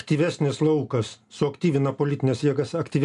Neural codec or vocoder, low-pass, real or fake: none; 10.8 kHz; real